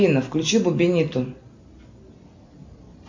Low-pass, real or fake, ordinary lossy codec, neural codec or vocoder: 7.2 kHz; real; MP3, 48 kbps; none